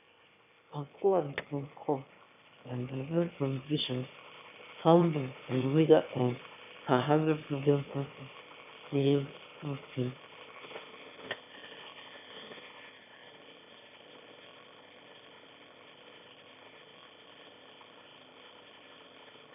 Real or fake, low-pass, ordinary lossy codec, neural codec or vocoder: fake; 3.6 kHz; none; autoencoder, 22.05 kHz, a latent of 192 numbers a frame, VITS, trained on one speaker